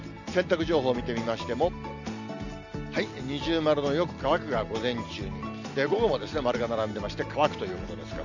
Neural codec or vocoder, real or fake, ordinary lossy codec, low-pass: none; real; none; 7.2 kHz